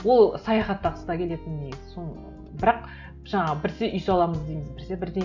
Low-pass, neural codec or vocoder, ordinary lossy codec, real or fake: 7.2 kHz; none; none; real